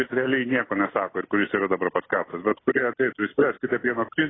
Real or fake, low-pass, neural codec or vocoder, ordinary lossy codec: real; 7.2 kHz; none; AAC, 16 kbps